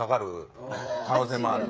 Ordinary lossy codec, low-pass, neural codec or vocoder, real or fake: none; none; codec, 16 kHz, 16 kbps, FreqCodec, smaller model; fake